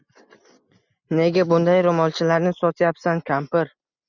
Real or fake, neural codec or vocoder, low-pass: real; none; 7.2 kHz